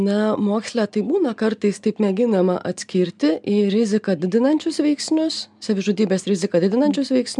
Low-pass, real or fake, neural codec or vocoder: 10.8 kHz; real; none